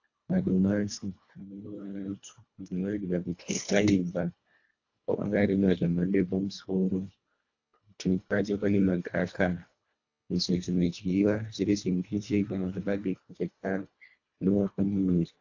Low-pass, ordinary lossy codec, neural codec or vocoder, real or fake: 7.2 kHz; AAC, 48 kbps; codec, 24 kHz, 1.5 kbps, HILCodec; fake